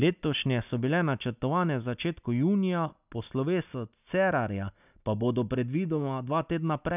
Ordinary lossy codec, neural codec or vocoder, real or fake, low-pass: none; none; real; 3.6 kHz